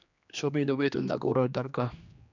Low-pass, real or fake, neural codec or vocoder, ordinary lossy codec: 7.2 kHz; fake; codec, 16 kHz, 2 kbps, X-Codec, HuBERT features, trained on general audio; none